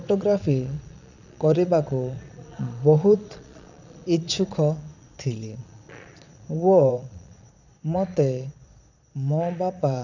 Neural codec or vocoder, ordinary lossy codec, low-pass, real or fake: vocoder, 22.05 kHz, 80 mel bands, Vocos; none; 7.2 kHz; fake